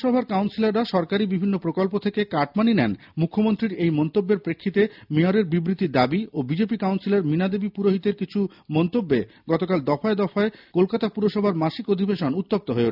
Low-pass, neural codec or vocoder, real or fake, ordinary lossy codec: 5.4 kHz; none; real; none